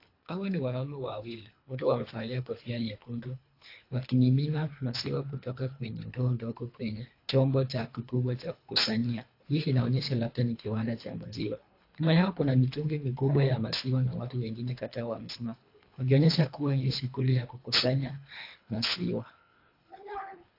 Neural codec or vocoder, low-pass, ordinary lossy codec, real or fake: codec, 24 kHz, 3 kbps, HILCodec; 5.4 kHz; AAC, 32 kbps; fake